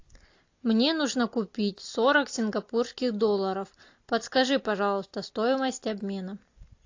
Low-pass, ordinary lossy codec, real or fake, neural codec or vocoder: 7.2 kHz; AAC, 48 kbps; real; none